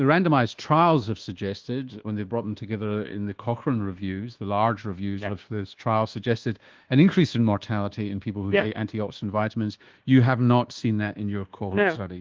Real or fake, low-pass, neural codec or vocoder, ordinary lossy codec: fake; 7.2 kHz; codec, 24 kHz, 1.2 kbps, DualCodec; Opus, 16 kbps